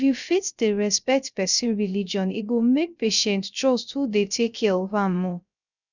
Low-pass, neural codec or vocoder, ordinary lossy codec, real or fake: 7.2 kHz; codec, 16 kHz, 0.3 kbps, FocalCodec; none; fake